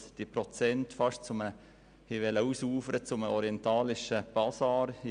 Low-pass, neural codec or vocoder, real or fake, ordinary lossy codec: 9.9 kHz; none; real; none